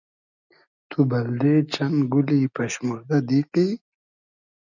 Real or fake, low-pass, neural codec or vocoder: real; 7.2 kHz; none